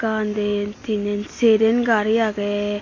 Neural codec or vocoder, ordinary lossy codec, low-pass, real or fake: none; AAC, 32 kbps; 7.2 kHz; real